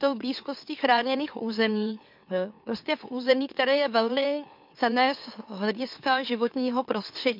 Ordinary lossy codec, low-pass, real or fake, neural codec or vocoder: MP3, 48 kbps; 5.4 kHz; fake; autoencoder, 44.1 kHz, a latent of 192 numbers a frame, MeloTTS